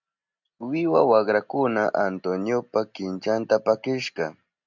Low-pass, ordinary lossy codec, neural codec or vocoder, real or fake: 7.2 kHz; MP3, 64 kbps; none; real